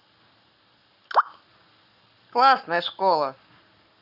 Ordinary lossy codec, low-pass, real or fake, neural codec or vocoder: none; 5.4 kHz; fake; codec, 44.1 kHz, 7.8 kbps, Pupu-Codec